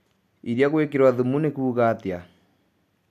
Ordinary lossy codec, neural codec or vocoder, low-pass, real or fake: AAC, 96 kbps; none; 14.4 kHz; real